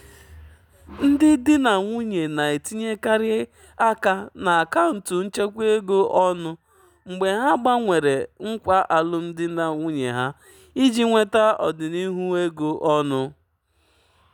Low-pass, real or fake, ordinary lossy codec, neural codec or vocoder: 19.8 kHz; real; none; none